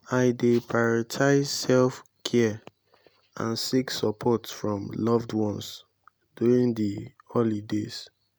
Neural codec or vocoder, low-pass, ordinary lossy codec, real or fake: none; none; none; real